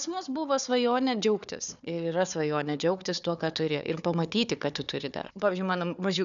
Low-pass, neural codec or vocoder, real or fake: 7.2 kHz; codec, 16 kHz, 4 kbps, FunCodec, trained on Chinese and English, 50 frames a second; fake